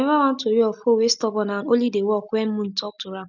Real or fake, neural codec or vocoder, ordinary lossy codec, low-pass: real; none; none; 7.2 kHz